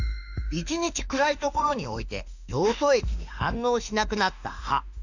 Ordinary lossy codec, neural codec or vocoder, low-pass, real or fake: none; autoencoder, 48 kHz, 32 numbers a frame, DAC-VAE, trained on Japanese speech; 7.2 kHz; fake